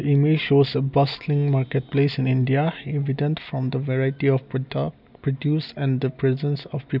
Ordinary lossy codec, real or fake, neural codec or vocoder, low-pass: none; real; none; 5.4 kHz